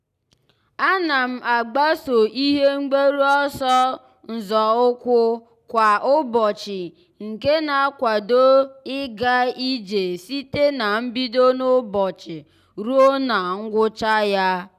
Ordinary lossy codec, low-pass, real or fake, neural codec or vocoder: none; 14.4 kHz; real; none